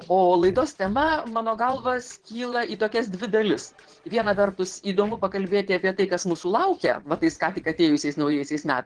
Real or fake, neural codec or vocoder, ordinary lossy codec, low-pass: fake; vocoder, 22.05 kHz, 80 mel bands, Vocos; Opus, 16 kbps; 9.9 kHz